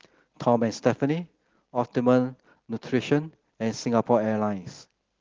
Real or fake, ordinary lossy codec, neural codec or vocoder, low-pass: real; Opus, 16 kbps; none; 7.2 kHz